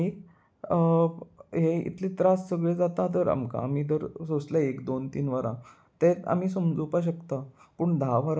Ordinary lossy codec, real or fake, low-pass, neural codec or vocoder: none; real; none; none